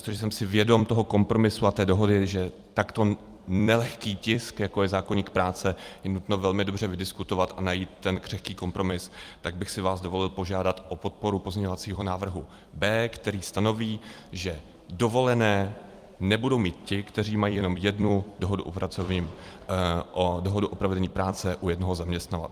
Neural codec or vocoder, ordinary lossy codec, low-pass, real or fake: vocoder, 44.1 kHz, 128 mel bands every 256 samples, BigVGAN v2; Opus, 32 kbps; 14.4 kHz; fake